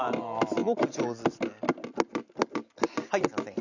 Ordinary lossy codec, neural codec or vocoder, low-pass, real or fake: none; none; 7.2 kHz; real